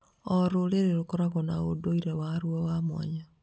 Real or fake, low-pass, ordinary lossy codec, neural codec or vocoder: real; none; none; none